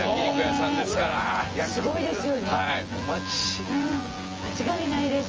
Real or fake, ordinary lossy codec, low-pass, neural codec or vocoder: fake; Opus, 24 kbps; 7.2 kHz; vocoder, 24 kHz, 100 mel bands, Vocos